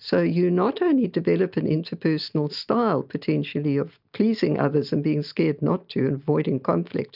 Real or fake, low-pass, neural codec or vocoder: real; 5.4 kHz; none